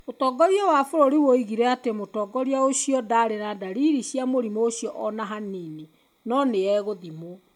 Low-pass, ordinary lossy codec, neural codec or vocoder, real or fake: 19.8 kHz; MP3, 96 kbps; none; real